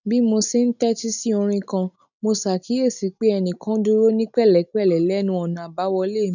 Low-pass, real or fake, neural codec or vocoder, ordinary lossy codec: 7.2 kHz; real; none; none